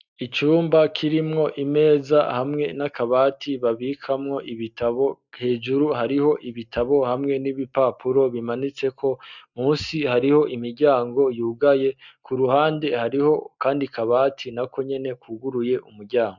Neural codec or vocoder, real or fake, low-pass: none; real; 7.2 kHz